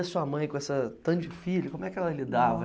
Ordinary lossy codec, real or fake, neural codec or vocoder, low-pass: none; real; none; none